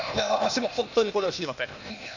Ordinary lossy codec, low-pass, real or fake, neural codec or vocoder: none; 7.2 kHz; fake; codec, 16 kHz, 0.8 kbps, ZipCodec